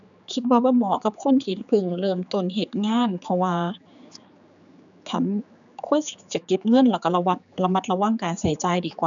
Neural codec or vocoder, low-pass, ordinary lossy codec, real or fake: codec, 16 kHz, 4 kbps, X-Codec, HuBERT features, trained on general audio; 7.2 kHz; none; fake